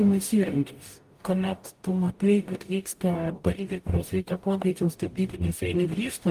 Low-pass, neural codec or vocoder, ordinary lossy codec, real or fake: 14.4 kHz; codec, 44.1 kHz, 0.9 kbps, DAC; Opus, 32 kbps; fake